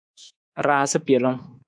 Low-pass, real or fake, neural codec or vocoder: 9.9 kHz; fake; codec, 24 kHz, 3.1 kbps, DualCodec